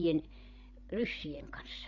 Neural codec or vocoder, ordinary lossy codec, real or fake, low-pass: codec, 16 kHz, 16 kbps, FreqCodec, larger model; MP3, 48 kbps; fake; 7.2 kHz